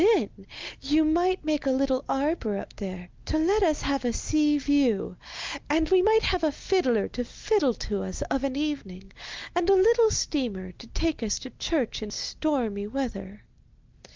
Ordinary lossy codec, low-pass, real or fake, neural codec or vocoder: Opus, 32 kbps; 7.2 kHz; real; none